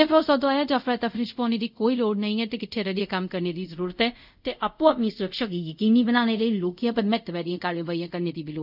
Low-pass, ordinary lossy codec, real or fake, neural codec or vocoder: 5.4 kHz; none; fake; codec, 24 kHz, 0.5 kbps, DualCodec